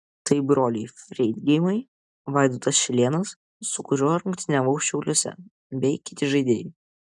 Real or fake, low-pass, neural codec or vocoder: real; 10.8 kHz; none